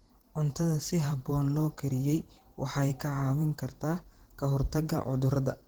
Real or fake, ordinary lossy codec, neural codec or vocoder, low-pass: fake; Opus, 16 kbps; vocoder, 44.1 kHz, 128 mel bands every 512 samples, BigVGAN v2; 19.8 kHz